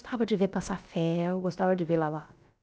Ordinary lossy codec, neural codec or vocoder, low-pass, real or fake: none; codec, 16 kHz, about 1 kbps, DyCAST, with the encoder's durations; none; fake